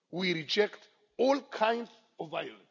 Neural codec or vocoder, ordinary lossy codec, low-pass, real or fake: none; none; 7.2 kHz; real